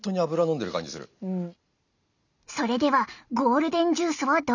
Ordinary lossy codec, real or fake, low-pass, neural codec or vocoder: none; real; 7.2 kHz; none